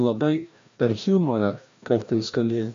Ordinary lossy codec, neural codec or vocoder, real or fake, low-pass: MP3, 48 kbps; codec, 16 kHz, 1 kbps, FreqCodec, larger model; fake; 7.2 kHz